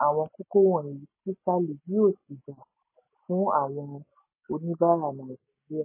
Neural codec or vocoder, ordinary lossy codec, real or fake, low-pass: none; MP3, 16 kbps; real; 3.6 kHz